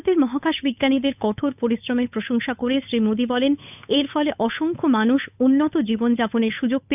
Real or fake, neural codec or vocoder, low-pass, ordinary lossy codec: fake; codec, 16 kHz, 8 kbps, FunCodec, trained on Chinese and English, 25 frames a second; 3.6 kHz; none